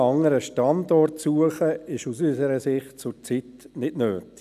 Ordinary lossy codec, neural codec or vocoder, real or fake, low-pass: none; none; real; 14.4 kHz